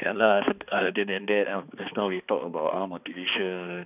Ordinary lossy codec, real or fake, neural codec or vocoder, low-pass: none; fake; codec, 16 kHz, 2 kbps, X-Codec, HuBERT features, trained on balanced general audio; 3.6 kHz